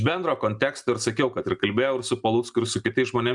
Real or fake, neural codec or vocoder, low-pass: real; none; 10.8 kHz